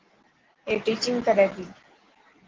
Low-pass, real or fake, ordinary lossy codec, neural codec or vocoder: 7.2 kHz; real; Opus, 16 kbps; none